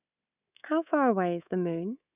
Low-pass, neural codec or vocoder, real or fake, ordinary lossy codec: 3.6 kHz; codec, 24 kHz, 3.1 kbps, DualCodec; fake; none